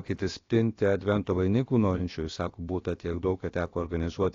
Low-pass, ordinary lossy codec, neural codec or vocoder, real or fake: 7.2 kHz; AAC, 32 kbps; codec, 16 kHz, about 1 kbps, DyCAST, with the encoder's durations; fake